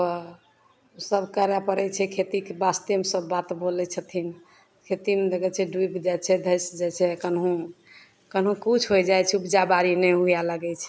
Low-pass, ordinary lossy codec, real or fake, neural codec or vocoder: none; none; real; none